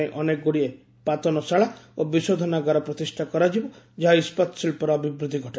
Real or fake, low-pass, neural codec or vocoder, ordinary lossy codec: real; none; none; none